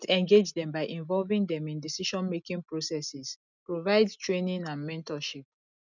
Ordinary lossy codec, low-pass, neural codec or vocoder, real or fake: none; 7.2 kHz; none; real